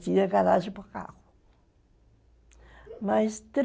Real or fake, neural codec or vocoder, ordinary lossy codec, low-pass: real; none; none; none